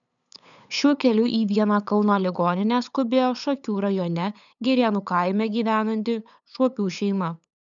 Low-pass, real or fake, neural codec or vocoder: 7.2 kHz; fake; codec, 16 kHz, 8 kbps, FunCodec, trained on LibriTTS, 25 frames a second